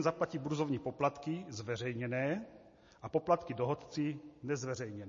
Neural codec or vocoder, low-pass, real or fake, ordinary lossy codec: none; 7.2 kHz; real; MP3, 32 kbps